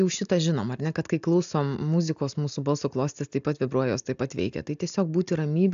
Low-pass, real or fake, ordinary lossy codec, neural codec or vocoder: 7.2 kHz; real; AAC, 96 kbps; none